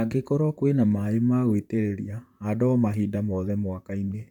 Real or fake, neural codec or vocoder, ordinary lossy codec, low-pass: fake; vocoder, 44.1 kHz, 128 mel bands, Pupu-Vocoder; none; 19.8 kHz